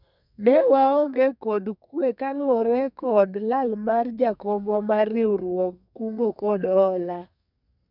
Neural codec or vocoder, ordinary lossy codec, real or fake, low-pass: codec, 32 kHz, 1.9 kbps, SNAC; none; fake; 5.4 kHz